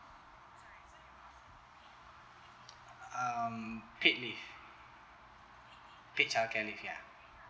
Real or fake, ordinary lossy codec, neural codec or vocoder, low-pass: real; none; none; none